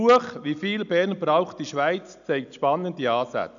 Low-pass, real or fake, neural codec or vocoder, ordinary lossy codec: 7.2 kHz; real; none; none